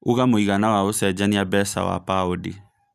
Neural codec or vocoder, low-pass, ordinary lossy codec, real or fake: none; 14.4 kHz; none; real